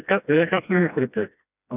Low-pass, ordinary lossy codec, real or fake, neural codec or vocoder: 3.6 kHz; none; fake; codec, 16 kHz, 1 kbps, FreqCodec, smaller model